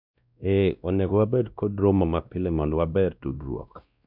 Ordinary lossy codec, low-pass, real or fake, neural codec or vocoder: none; 5.4 kHz; fake; codec, 16 kHz, 1 kbps, X-Codec, WavLM features, trained on Multilingual LibriSpeech